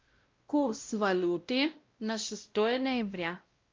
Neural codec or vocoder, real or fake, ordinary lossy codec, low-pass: codec, 16 kHz, 0.5 kbps, X-Codec, WavLM features, trained on Multilingual LibriSpeech; fake; Opus, 24 kbps; 7.2 kHz